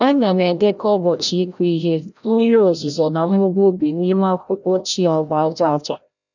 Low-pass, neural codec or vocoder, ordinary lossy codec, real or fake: 7.2 kHz; codec, 16 kHz, 0.5 kbps, FreqCodec, larger model; none; fake